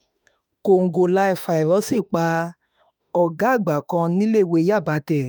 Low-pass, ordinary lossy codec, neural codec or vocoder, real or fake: none; none; autoencoder, 48 kHz, 32 numbers a frame, DAC-VAE, trained on Japanese speech; fake